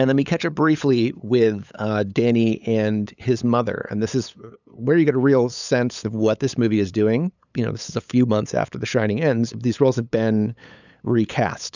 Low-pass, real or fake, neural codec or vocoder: 7.2 kHz; fake; codec, 16 kHz, 8 kbps, FunCodec, trained on LibriTTS, 25 frames a second